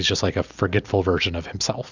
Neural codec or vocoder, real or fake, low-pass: none; real; 7.2 kHz